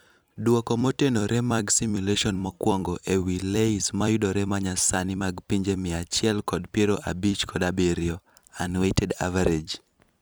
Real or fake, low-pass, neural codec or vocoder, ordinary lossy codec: fake; none; vocoder, 44.1 kHz, 128 mel bands every 256 samples, BigVGAN v2; none